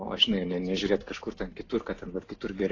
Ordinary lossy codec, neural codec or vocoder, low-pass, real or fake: AAC, 32 kbps; none; 7.2 kHz; real